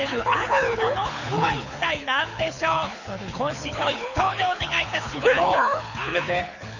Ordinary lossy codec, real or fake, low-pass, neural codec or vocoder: none; fake; 7.2 kHz; codec, 24 kHz, 6 kbps, HILCodec